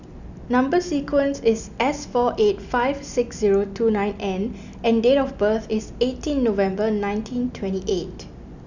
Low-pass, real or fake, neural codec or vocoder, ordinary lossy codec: 7.2 kHz; real; none; none